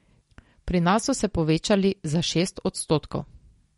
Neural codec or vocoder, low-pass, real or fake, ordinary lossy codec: none; 19.8 kHz; real; MP3, 48 kbps